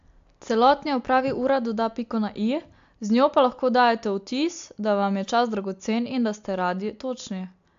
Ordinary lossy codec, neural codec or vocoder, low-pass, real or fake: AAC, 64 kbps; none; 7.2 kHz; real